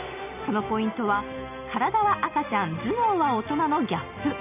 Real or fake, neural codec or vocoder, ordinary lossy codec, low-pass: real; none; none; 3.6 kHz